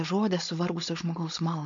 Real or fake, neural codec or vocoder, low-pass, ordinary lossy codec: fake; codec, 16 kHz, 4.8 kbps, FACodec; 7.2 kHz; MP3, 64 kbps